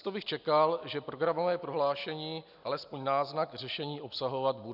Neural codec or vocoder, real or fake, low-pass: none; real; 5.4 kHz